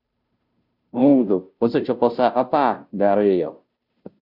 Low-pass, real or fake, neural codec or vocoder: 5.4 kHz; fake; codec, 16 kHz, 0.5 kbps, FunCodec, trained on Chinese and English, 25 frames a second